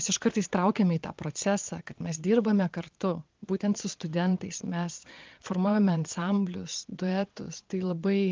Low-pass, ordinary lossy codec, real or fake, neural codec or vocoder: 7.2 kHz; Opus, 32 kbps; fake; vocoder, 22.05 kHz, 80 mel bands, Vocos